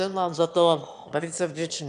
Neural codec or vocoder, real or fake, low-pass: autoencoder, 22.05 kHz, a latent of 192 numbers a frame, VITS, trained on one speaker; fake; 9.9 kHz